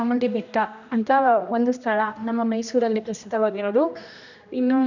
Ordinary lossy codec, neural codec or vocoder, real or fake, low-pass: none; codec, 16 kHz, 1 kbps, X-Codec, HuBERT features, trained on general audio; fake; 7.2 kHz